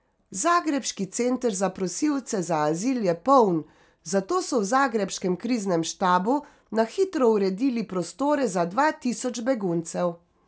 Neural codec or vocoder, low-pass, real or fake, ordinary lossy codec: none; none; real; none